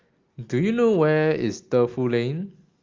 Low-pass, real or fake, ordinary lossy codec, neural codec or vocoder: 7.2 kHz; real; Opus, 32 kbps; none